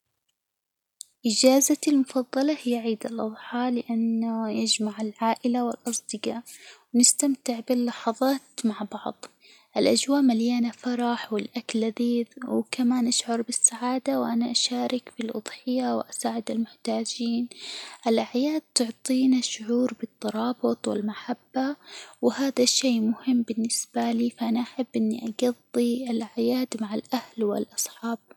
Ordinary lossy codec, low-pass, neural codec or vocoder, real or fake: none; 19.8 kHz; none; real